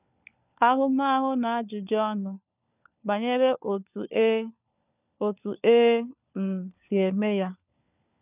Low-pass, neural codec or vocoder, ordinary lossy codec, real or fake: 3.6 kHz; codec, 16 kHz, 4 kbps, FunCodec, trained on LibriTTS, 50 frames a second; none; fake